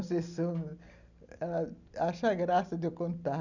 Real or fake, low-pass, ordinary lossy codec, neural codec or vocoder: real; 7.2 kHz; none; none